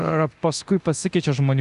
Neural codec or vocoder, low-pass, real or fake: codec, 24 kHz, 0.9 kbps, DualCodec; 10.8 kHz; fake